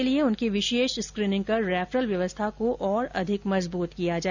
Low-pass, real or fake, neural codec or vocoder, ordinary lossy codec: 7.2 kHz; real; none; none